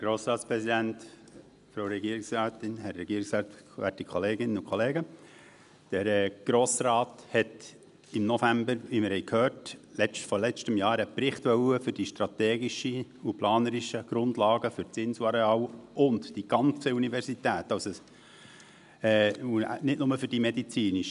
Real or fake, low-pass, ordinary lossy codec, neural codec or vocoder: real; 10.8 kHz; none; none